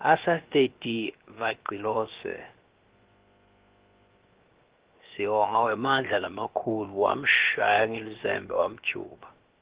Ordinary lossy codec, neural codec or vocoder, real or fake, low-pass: Opus, 16 kbps; codec, 16 kHz, about 1 kbps, DyCAST, with the encoder's durations; fake; 3.6 kHz